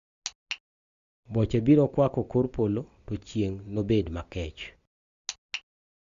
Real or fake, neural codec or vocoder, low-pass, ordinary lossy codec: real; none; 7.2 kHz; Opus, 64 kbps